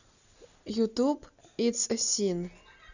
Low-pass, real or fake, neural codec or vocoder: 7.2 kHz; real; none